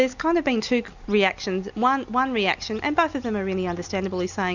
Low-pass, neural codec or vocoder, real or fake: 7.2 kHz; none; real